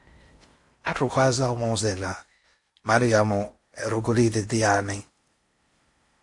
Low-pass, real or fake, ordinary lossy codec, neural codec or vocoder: 10.8 kHz; fake; MP3, 48 kbps; codec, 16 kHz in and 24 kHz out, 0.6 kbps, FocalCodec, streaming, 4096 codes